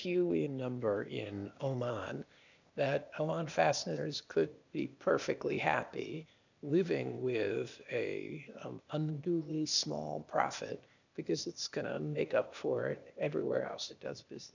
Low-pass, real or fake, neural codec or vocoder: 7.2 kHz; fake; codec, 16 kHz, 0.8 kbps, ZipCodec